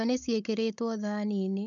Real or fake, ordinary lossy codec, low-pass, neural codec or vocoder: fake; none; 7.2 kHz; codec, 16 kHz, 16 kbps, FunCodec, trained on Chinese and English, 50 frames a second